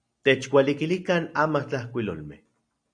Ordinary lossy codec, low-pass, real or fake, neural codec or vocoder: AAC, 64 kbps; 9.9 kHz; real; none